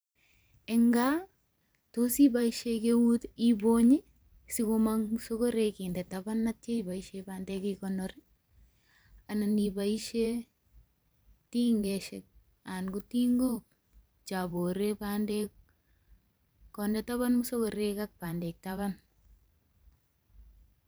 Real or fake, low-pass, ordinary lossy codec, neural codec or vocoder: fake; none; none; vocoder, 44.1 kHz, 128 mel bands every 512 samples, BigVGAN v2